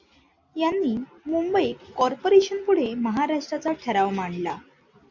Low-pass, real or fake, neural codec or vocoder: 7.2 kHz; real; none